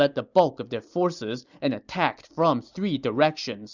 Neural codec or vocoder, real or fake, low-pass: none; real; 7.2 kHz